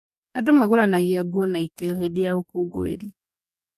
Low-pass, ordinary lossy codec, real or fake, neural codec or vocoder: 14.4 kHz; none; fake; codec, 44.1 kHz, 2.6 kbps, DAC